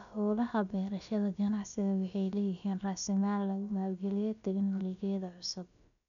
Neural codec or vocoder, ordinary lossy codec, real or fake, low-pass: codec, 16 kHz, about 1 kbps, DyCAST, with the encoder's durations; none; fake; 7.2 kHz